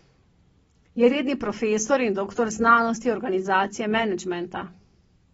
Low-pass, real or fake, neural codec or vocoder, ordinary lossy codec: 19.8 kHz; real; none; AAC, 24 kbps